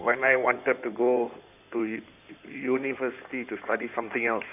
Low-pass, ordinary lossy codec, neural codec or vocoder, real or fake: 3.6 kHz; none; codec, 16 kHz in and 24 kHz out, 2.2 kbps, FireRedTTS-2 codec; fake